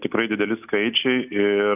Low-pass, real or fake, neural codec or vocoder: 3.6 kHz; real; none